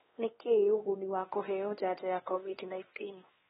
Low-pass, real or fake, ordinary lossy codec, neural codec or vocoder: 7.2 kHz; fake; AAC, 16 kbps; codec, 16 kHz, 2 kbps, X-Codec, WavLM features, trained on Multilingual LibriSpeech